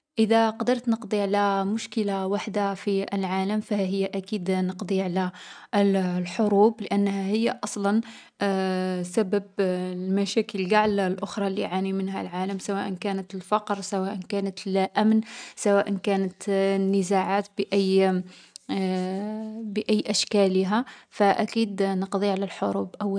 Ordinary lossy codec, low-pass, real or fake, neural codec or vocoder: none; 9.9 kHz; real; none